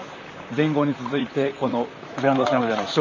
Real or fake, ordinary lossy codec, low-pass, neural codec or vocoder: fake; none; 7.2 kHz; vocoder, 44.1 kHz, 128 mel bands, Pupu-Vocoder